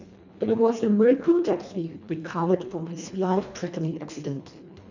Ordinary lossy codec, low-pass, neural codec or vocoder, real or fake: none; 7.2 kHz; codec, 24 kHz, 1.5 kbps, HILCodec; fake